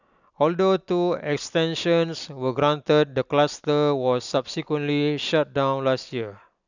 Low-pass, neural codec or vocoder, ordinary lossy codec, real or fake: 7.2 kHz; none; none; real